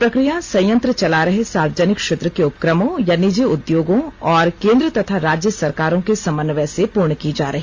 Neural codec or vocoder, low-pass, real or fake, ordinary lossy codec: none; 7.2 kHz; real; Opus, 32 kbps